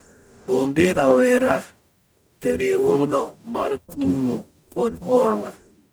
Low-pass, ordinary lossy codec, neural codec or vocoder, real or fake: none; none; codec, 44.1 kHz, 0.9 kbps, DAC; fake